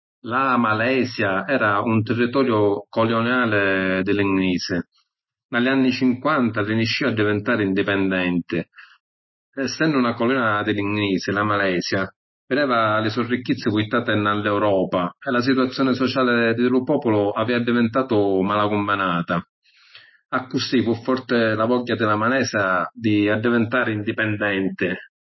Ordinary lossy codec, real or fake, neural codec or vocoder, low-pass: MP3, 24 kbps; real; none; 7.2 kHz